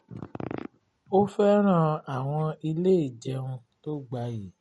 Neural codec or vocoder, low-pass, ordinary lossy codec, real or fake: none; 14.4 kHz; MP3, 48 kbps; real